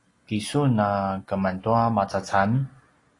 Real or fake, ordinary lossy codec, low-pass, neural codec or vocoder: real; AAC, 32 kbps; 10.8 kHz; none